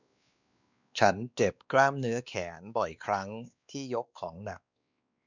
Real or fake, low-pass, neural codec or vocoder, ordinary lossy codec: fake; 7.2 kHz; codec, 16 kHz, 2 kbps, X-Codec, WavLM features, trained on Multilingual LibriSpeech; none